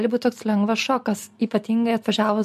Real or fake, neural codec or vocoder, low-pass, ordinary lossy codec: real; none; 14.4 kHz; MP3, 64 kbps